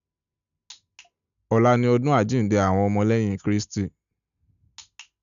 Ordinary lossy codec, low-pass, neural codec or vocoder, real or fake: none; 7.2 kHz; none; real